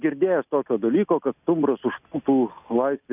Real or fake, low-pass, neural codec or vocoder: real; 3.6 kHz; none